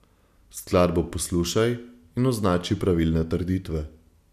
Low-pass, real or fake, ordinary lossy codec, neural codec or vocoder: 14.4 kHz; real; none; none